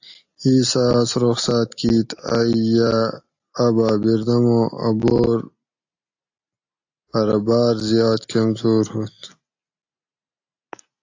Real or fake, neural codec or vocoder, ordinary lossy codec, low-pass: real; none; AAC, 48 kbps; 7.2 kHz